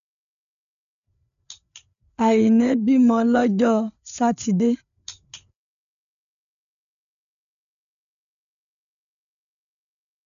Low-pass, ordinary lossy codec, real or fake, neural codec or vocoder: 7.2 kHz; none; fake; codec, 16 kHz, 4 kbps, FreqCodec, larger model